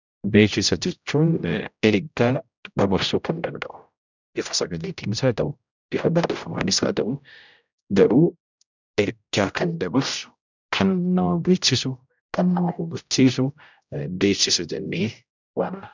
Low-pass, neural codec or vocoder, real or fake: 7.2 kHz; codec, 16 kHz, 0.5 kbps, X-Codec, HuBERT features, trained on general audio; fake